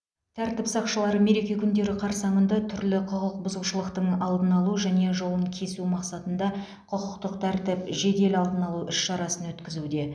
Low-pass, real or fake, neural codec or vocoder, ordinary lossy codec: none; real; none; none